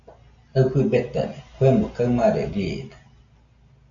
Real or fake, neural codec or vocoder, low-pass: real; none; 7.2 kHz